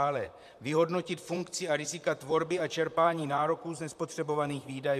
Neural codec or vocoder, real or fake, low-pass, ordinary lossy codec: vocoder, 44.1 kHz, 128 mel bands, Pupu-Vocoder; fake; 14.4 kHz; MP3, 96 kbps